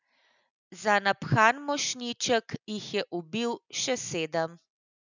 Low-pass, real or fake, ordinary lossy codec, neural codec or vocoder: 7.2 kHz; real; none; none